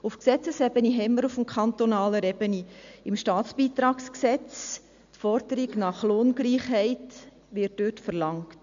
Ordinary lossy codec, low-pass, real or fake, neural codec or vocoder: MP3, 64 kbps; 7.2 kHz; real; none